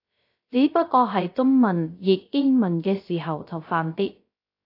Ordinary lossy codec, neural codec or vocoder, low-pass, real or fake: AAC, 32 kbps; codec, 16 kHz, 0.3 kbps, FocalCodec; 5.4 kHz; fake